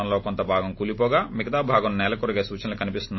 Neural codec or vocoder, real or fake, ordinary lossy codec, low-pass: none; real; MP3, 24 kbps; 7.2 kHz